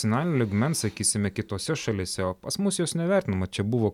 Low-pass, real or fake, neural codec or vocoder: 19.8 kHz; real; none